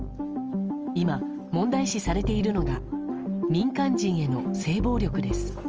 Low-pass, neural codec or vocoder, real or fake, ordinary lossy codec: 7.2 kHz; none; real; Opus, 24 kbps